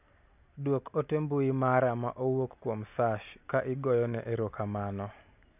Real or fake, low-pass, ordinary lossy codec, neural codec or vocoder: real; 3.6 kHz; none; none